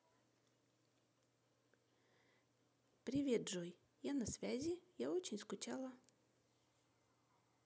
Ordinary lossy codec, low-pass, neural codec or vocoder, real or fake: none; none; none; real